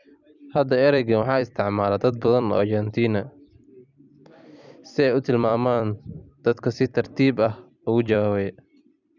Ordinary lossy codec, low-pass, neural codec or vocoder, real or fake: none; 7.2 kHz; vocoder, 44.1 kHz, 128 mel bands every 256 samples, BigVGAN v2; fake